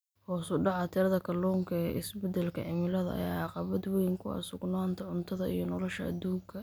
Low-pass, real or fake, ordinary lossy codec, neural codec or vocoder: none; real; none; none